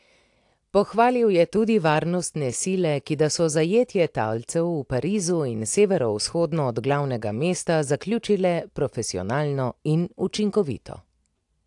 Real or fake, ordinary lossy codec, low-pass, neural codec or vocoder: real; AAC, 64 kbps; 10.8 kHz; none